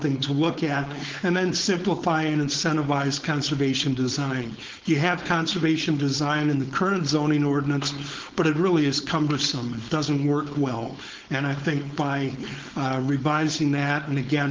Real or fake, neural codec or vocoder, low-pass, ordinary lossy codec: fake; codec, 16 kHz, 4.8 kbps, FACodec; 7.2 kHz; Opus, 16 kbps